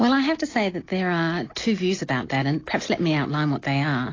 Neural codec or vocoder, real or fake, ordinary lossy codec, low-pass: none; real; AAC, 32 kbps; 7.2 kHz